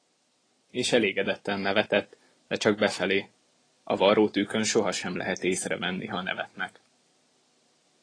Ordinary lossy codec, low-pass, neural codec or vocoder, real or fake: AAC, 32 kbps; 9.9 kHz; none; real